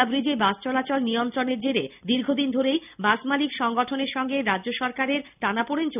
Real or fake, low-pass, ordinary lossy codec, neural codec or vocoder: real; 3.6 kHz; none; none